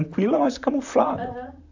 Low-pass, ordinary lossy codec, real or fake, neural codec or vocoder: 7.2 kHz; AAC, 48 kbps; fake; vocoder, 44.1 kHz, 128 mel bands, Pupu-Vocoder